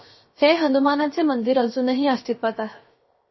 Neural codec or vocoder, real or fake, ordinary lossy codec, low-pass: codec, 16 kHz, 0.7 kbps, FocalCodec; fake; MP3, 24 kbps; 7.2 kHz